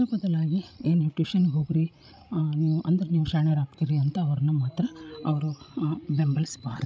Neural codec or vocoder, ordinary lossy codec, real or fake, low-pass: codec, 16 kHz, 16 kbps, FreqCodec, larger model; none; fake; none